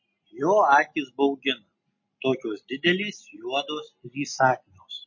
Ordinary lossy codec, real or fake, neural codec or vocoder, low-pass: MP3, 32 kbps; real; none; 7.2 kHz